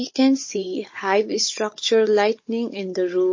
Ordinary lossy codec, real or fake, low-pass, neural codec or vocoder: MP3, 32 kbps; fake; 7.2 kHz; codec, 16 kHz, 16 kbps, FunCodec, trained on Chinese and English, 50 frames a second